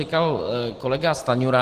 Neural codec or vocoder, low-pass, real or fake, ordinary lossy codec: none; 14.4 kHz; real; Opus, 16 kbps